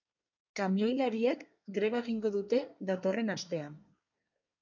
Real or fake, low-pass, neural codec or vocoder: fake; 7.2 kHz; codec, 24 kHz, 1 kbps, SNAC